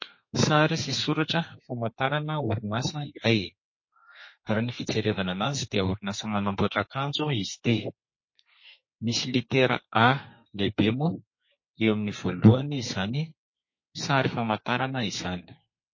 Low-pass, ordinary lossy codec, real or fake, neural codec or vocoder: 7.2 kHz; MP3, 32 kbps; fake; codec, 32 kHz, 1.9 kbps, SNAC